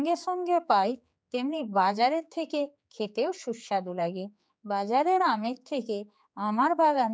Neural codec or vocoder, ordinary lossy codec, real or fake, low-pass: codec, 16 kHz, 4 kbps, X-Codec, HuBERT features, trained on general audio; none; fake; none